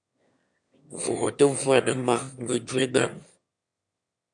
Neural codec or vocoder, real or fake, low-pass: autoencoder, 22.05 kHz, a latent of 192 numbers a frame, VITS, trained on one speaker; fake; 9.9 kHz